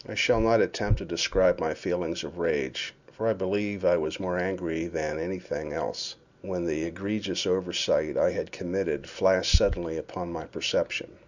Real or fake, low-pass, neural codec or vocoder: real; 7.2 kHz; none